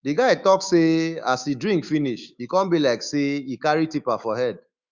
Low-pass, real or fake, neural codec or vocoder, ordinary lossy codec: 7.2 kHz; fake; autoencoder, 48 kHz, 128 numbers a frame, DAC-VAE, trained on Japanese speech; Opus, 64 kbps